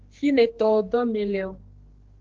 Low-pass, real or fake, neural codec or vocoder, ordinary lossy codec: 7.2 kHz; fake; codec, 16 kHz, 2 kbps, X-Codec, HuBERT features, trained on general audio; Opus, 16 kbps